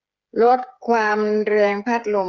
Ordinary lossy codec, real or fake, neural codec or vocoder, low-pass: Opus, 24 kbps; fake; codec, 16 kHz, 8 kbps, FreqCodec, smaller model; 7.2 kHz